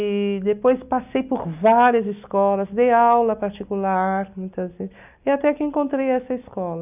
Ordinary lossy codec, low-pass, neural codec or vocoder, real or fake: none; 3.6 kHz; none; real